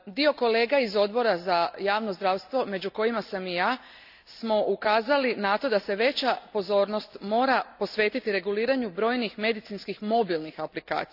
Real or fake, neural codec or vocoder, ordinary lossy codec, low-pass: real; none; none; 5.4 kHz